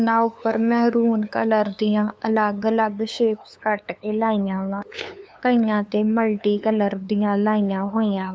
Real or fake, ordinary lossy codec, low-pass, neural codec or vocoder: fake; none; none; codec, 16 kHz, 2 kbps, FunCodec, trained on LibriTTS, 25 frames a second